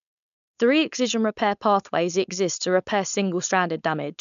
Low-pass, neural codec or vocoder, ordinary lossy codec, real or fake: 7.2 kHz; codec, 16 kHz, 4.8 kbps, FACodec; none; fake